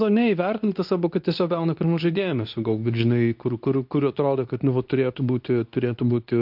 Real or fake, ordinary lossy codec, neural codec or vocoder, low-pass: fake; MP3, 48 kbps; codec, 24 kHz, 0.9 kbps, WavTokenizer, medium speech release version 2; 5.4 kHz